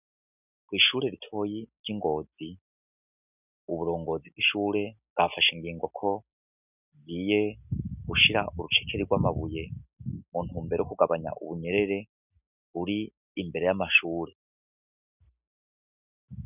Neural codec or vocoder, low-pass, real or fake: none; 3.6 kHz; real